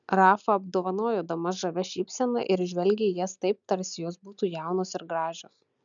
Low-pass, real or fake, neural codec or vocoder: 7.2 kHz; real; none